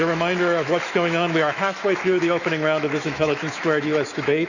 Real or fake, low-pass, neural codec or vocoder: fake; 7.2 kHz; autoencoder, 48 kHz, 128 numbers a frame, DAC-VAE, trained on Japanese speech